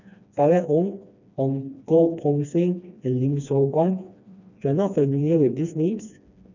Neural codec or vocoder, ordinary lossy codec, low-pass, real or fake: codec, 16 kHz, 2 kbps, FreqCodec, smaller model; none; 7.2 kHz; fake